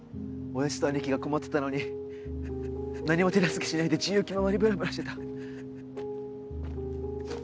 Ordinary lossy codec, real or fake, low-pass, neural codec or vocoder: none; real; none; none